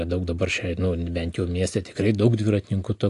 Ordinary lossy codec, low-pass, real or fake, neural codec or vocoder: AAC, 48 kbps; 10.8 kHz; real; none